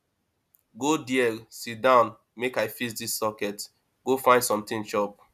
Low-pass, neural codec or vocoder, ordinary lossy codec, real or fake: 14.4 kHz; none; none; real